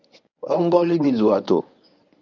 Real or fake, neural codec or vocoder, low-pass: fake; codec, 24 kHz, 0.9 kbps, WavTokenizer, medium speech release version 1; 7.2 kHz